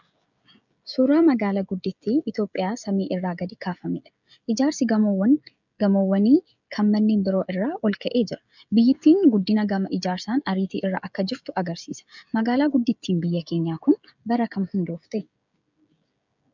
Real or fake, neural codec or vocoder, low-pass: fake; codec, 16 kHz, 16 kbps, FreqCodec, smaller model; 7.2 kHz